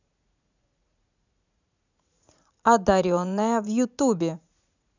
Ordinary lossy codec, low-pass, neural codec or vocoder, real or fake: none; 7.2 kHz; vocoder, 44.1 kHz, 80 mel bands, Vocos; fake